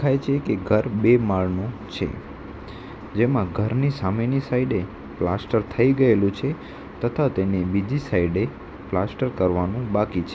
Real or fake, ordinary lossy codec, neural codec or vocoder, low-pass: real; none; none; none